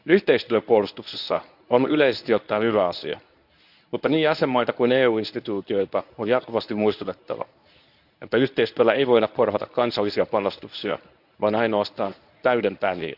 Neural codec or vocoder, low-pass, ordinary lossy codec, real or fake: codec, 24 kHz, 0.9 kbps, WavTokenizer, medium speech release version 1; 5.4 kHz; none; fake